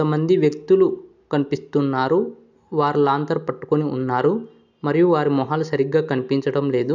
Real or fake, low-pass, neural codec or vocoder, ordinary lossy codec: real; 7.2 kHz; none; none